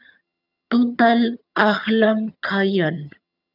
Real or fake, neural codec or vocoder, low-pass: fake; vocoder, 22.05 kHz, 80 mel bands, HiFi-GAN; 5.4 kHz